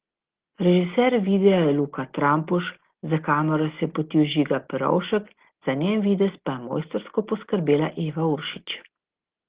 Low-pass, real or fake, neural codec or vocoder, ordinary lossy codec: 3.6 kHz; real; none; Opus, 16 kbps